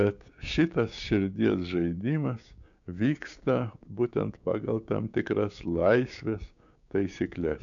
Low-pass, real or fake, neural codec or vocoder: 7.2 kHz; fake; codec, 16 kHz, 6 kbps, DAC